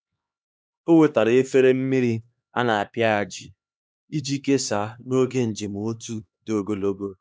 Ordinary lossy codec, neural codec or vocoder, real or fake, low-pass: none; codec, 16 kHz, 2 kbps, X-Codec, HuBERT features, trained on LibriSpeech; fake; none